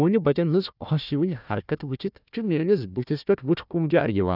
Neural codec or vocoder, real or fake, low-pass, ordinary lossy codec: codec, 16 kHz, 1 kbps, FunCodec, trained on Chinese and English, 50 frames a second; fake; 5.4 kHz; none